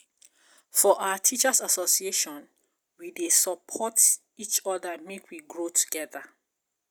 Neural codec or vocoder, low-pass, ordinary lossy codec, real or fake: none; none; none; real